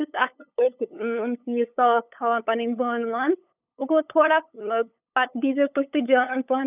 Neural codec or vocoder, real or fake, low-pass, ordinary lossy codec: codec, 16 kHz, 8 kbps, FunCodec, trained on LibriTTS, 25 frames a second; fake; 3.6 kHz; none